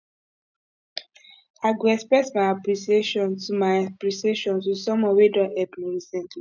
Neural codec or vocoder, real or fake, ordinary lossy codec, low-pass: none; real; none; 7.2 kHz